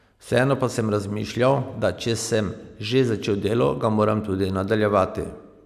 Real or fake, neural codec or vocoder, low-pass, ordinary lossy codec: real; none; 14.4 kHz; none